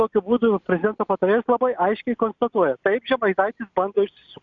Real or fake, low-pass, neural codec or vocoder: real; 7.2 kHz; none